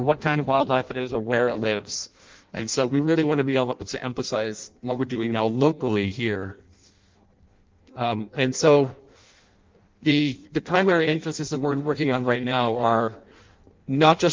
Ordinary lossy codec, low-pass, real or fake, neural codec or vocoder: Opus, 32 kbps; 7.2 kHz; fake; codec, 16 kHz in and 24 kHz out, 0.6 kbps, FireRedTTS-2 codec